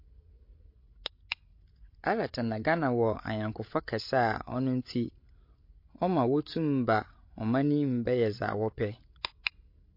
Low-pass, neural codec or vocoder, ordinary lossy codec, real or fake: 5.4 kHz; codec, 16 kHz, 16 kbps, FreqCodec, larger model; MP3, 32 kbps; fake